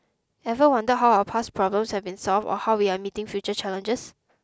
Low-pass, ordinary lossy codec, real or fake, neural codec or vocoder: none; none; real; none